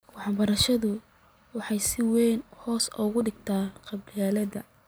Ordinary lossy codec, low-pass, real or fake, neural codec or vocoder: none; none; real; none